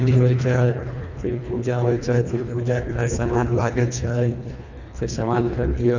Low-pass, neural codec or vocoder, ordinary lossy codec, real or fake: 7.2 kHz; codec, 24 kHz, 1.5 kbps, HILCodec; none; fake